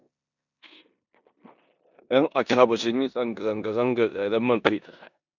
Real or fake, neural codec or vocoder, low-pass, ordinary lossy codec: fake; codec, 16 kHz in and 24 kHz out, 0.9 kbps, LongCat-Audio-Codec, four codebook decoder; 7.2 kHz; none